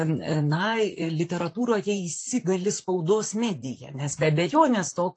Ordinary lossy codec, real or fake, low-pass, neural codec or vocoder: AAC, 48 kbps; fake; 9.9 kHz; vocoder, 44.1 kHz, 128 mel bands, Pupu-Vocoder